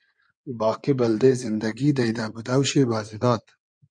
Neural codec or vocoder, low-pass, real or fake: codec, 16 kHz in and 24 kHz out, 2.2 kbps, FireRedTTS-2 codec; 9.9 kHz; fake